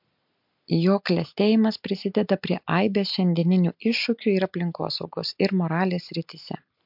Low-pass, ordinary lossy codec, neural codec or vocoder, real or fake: 5.4 kHz; MP3, 48 kbps; none; real